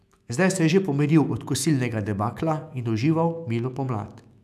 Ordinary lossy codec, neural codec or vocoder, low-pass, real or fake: none; autoencoder, 48 kHz, 128 numbers a frame, DAC-VAE, trained on Japanese speech; 14.4 kHz; fake